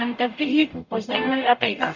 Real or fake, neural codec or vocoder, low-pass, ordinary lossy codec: fake; codec, 44.1 kHz, 0.9 kbps, DAC; 7.2 kHz; none